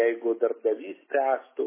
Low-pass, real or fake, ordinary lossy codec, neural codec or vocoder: 3.6 kHz; real; MP3, 16 kbps; none